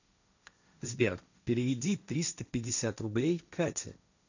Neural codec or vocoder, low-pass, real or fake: codec, 16 kHz, 1.1 kbps, Voila-Tokenizer; 7.2 kHz; fake